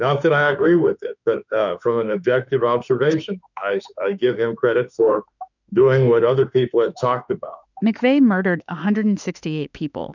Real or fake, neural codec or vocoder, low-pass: fake; autoencoder, 48 kHz, 32 numbers a frame, DAC-VAE, trained on Japanese speech; 7.2 kHz